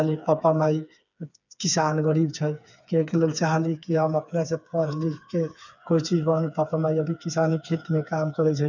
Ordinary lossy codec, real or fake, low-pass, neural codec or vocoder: none; fake; 7.2 kHz; codec, 16 kHz, 4 kbps, FreqCodec, smaller model